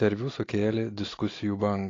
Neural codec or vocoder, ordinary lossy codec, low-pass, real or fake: none; AAC, 32 kbps; 7.2 kHz; real